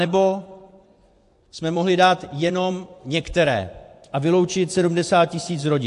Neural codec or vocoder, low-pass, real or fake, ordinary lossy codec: vocoder, 24 kHz, 100 mel bands, Vocos; 10.8 kHz; fake; AAC, 64 kbps